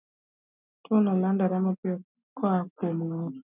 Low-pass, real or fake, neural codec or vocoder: 3.6 kHz; real; none